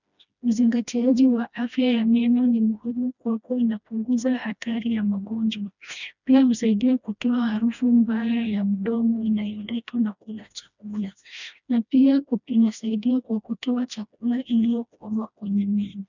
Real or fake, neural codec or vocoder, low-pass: fake; codec, 16 kHz, 1 kbps, FreqCodec, smaller model; 7.2 kHz